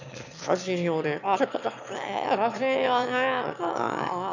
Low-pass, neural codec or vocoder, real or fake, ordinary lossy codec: 7.2 kHz; autoencoder, 22.05 kHz, a latent of 192 numbers a frame, VITS, trained on one speaker; fake; none